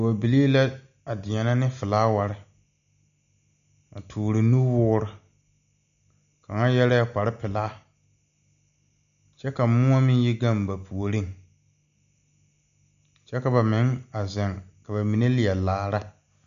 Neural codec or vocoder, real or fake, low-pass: none; real; 7.2 kHz